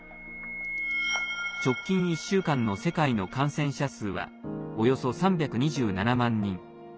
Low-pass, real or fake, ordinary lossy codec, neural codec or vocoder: none; real; none; none